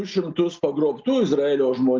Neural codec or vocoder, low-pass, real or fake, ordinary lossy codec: none; 7.2 kHz; real; Opus, 32 kbps